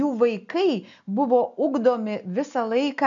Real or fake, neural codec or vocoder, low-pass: real; none; 7.2 kHz